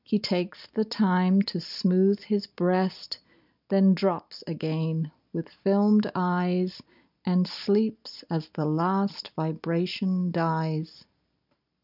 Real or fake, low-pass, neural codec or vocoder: real; 5.4 kHz; none